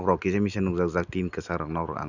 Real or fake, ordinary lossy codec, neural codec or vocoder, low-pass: real; none; none; 7.2 kHz